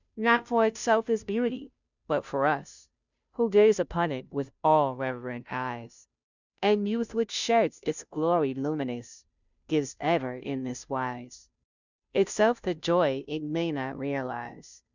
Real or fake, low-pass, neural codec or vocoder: fake; 7.2 kHz; codec, 16 kHz, 0.5 kbps, FunCodec, trained on Chinese and English, 25 frames a second